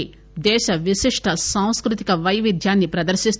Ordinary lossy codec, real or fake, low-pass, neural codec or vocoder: none; real; none; none